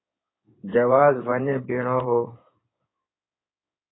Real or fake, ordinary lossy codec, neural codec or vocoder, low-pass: fake; AAC, 16 kbps; codec, 16 kHz in and 24 kHz out, 2.2 kbps, FireRedTTS-2 codec; 7.2 kHz